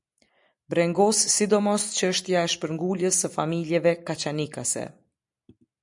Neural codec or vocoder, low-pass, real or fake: none; 10.8 kHz; real